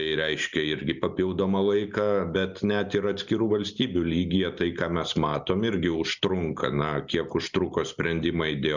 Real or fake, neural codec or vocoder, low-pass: real; none; 7.2 kHz